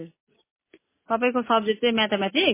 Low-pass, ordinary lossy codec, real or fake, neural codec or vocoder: 3.6 kHz; MP3, 16 kbps; real; none